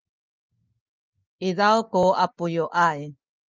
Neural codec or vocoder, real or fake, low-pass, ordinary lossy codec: none; real; 7.2 kHz; Opus, 32 kbps